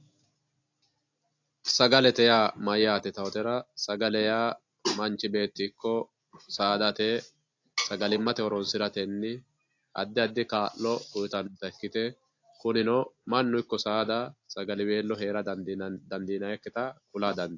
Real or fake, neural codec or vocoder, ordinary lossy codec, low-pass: real; none; AAC, 48 kbps; 7.2 kHz